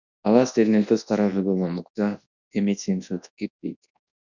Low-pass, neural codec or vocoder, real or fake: 7.2 kHz; codec, 24 kHz, 0.9 kbps, WavTokenizer, large speech release; fake